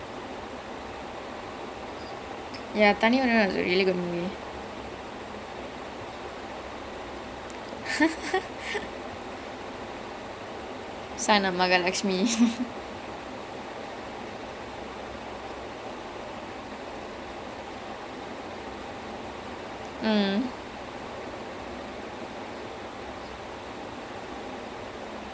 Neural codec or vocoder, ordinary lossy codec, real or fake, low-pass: none; none; real; none